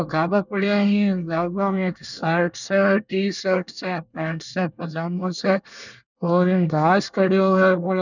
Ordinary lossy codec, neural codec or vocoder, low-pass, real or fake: none; codec, 24 kHz, 1 kbps, SNAC; 7.2 kHz; fake